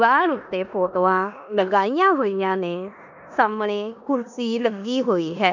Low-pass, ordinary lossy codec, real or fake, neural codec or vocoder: 7.2 kHz; none; fake; codec, 16 kHz in and 24 kHz out, 0.9 kbps, LongCat-Audio-Codec, four codebook decoder